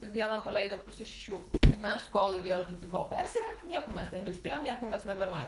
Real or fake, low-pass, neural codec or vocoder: fake; 10.8 kHz; codec, 24 kHz, 1.5 kbps, HILCodec